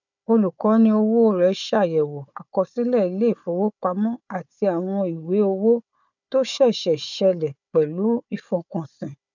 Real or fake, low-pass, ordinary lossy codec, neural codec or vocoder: fake; 7.2 kHz; none; codec, 16 kHz, 4 kbps, FunCodec, trained on Chinese and English, 50 frames a second